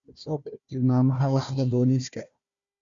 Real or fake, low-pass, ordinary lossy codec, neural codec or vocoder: fake; 7.2 kHz; Opus, 64 kbps; codec, 16 kHz, 1 kbps, FunCodec, trained on Chinese and English, 50 frames a second